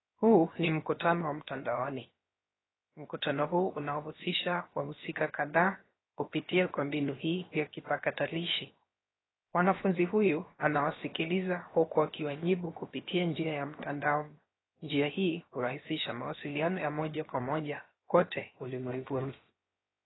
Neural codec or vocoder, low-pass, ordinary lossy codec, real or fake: codec, 16 kHz, 0.7 kbps, FocalCodec; 7.2 kHz; AAC, 16 kbps; fake